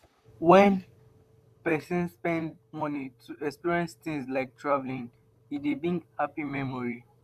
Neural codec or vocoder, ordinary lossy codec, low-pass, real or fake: vocoder, 44.1 kHz, 128 mel bands, Pupu-Vocoder; none; 14.4 kHz; fake